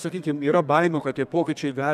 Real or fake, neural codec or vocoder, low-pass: fake; codec, 32 kHz, 1.9 kbps, SNAC; 14.4 kHz